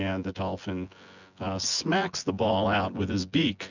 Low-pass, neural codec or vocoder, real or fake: 7.2 kHz; vocoder, 24 kHz, 100 mel bands, Vocos; fake